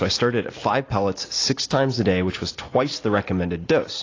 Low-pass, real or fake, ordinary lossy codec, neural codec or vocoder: 7.2 kHz; real; AAC, 32 kbps; none